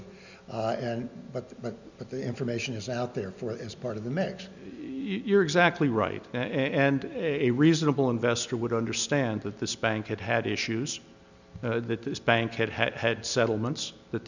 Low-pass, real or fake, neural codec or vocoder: 7.2 kHz; real; none